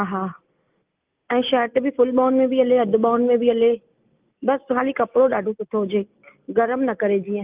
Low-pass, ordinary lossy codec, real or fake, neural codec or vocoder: 3.6 kHz; Opus, 24 kbps; real; none